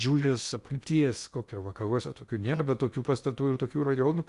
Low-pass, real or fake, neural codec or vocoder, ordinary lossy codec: 10.8 kHz; fake; codec, 16 kHz in and 24 kHz out, 0.6 kbps, FocalCodec, streaming, 2048 codes; Opus, 64 kbps